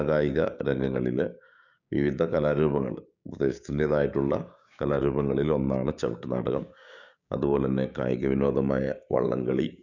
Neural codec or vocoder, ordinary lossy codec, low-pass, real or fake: codec, 16 kHz, 6 kbps, DAC; none; 7.2 kHz; fake